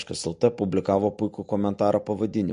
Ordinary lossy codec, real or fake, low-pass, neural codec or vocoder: MP3, 48 kbps; real; 9.9 kHz; none